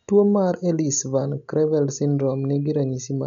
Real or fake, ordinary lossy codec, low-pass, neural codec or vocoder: real; none; 7.2 kHz; none